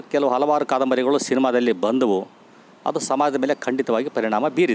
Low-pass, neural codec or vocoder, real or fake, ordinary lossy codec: none; none; real; none